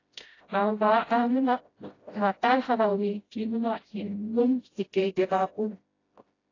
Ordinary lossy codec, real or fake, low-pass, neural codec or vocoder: AAC, 32 kbps; fake; 7.2 kHz; codec, 16 kHz, 0.5 kbps, FreqCodec, smaller model